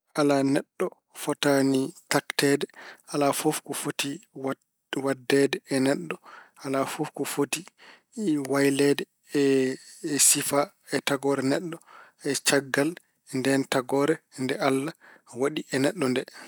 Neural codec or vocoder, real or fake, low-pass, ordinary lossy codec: none; real; none; none